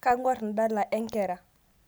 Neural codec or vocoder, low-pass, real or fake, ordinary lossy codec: none; none; real; none